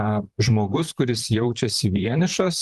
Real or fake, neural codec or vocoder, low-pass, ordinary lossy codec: fake; vocoder, 44.1 kHz, 128 mel bands, Pupu-Vocoder; 14.4 kHz; Opus, 32 kbps